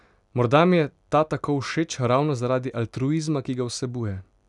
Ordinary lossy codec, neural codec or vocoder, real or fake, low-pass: none; none; real; 10.8 kHz